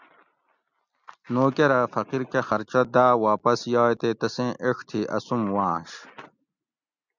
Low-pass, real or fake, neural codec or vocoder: 7.2 kHz; real; none